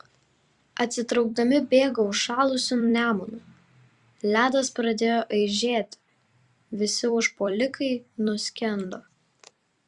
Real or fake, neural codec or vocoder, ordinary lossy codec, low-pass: fake; vocoder, 44.1 kHz, 128 mel bands every 256 samples, BigVGAN v2; Opus, 64 kbps; 10.8 kHz